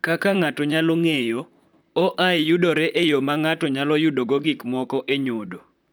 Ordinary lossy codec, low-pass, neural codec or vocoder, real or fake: none; none; vocoder, 44.1 kHz, 128 mel bands, Pupu-Vocoder; fake